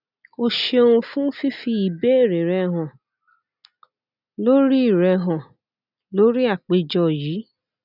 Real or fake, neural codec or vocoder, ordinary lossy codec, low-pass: real; none; none; 5.4 kHz